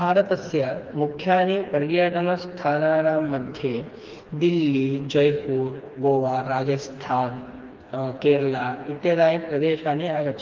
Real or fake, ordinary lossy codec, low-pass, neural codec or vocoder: fake; Opus, 32 kbps; 7.2 kHz; codec, 16 kHz, 2 kbps, FreqCodec, smaller model